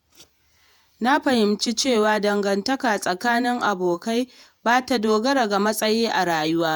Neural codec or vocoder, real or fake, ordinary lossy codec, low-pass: vocoder, 48 kHz, 128 mel bands, Vocos; fake; none; none